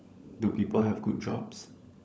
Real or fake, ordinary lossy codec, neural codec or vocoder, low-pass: fake; none; codec, 16 kHz, 16 kbps, FunCodec, trained on LibriTTS, 50 frames a second; none